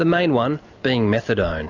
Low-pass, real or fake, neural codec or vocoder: 7.2 kHz; real; none